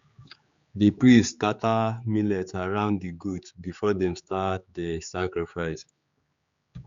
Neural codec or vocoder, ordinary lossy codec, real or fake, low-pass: codec, 16 kHz, 4 kbps, X-Codec, HuBERT features, trained on general audio; Opus, 64 kbps; fake; 7.2 kHz